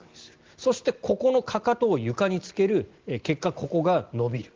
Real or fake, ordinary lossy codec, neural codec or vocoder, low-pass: real; Opus, 16 kbps; none; 7.2 kHz